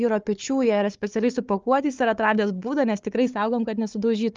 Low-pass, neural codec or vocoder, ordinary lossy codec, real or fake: 7.2 kHz; codec, 16 kHz, 4 kbps, FunCodec, trained on Chinese and English, 50 frames a second; Opus, 32 kbps; fake